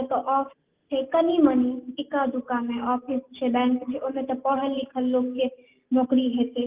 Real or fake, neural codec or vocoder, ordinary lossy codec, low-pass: real; none; Opus, 32 kbps; 3.6 kHz